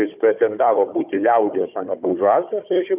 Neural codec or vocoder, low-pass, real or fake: codec, 16 kHz, 4 kbps, FunCodec, trained on Chinese and English, 50 frames a second; 3.6 kHz; fake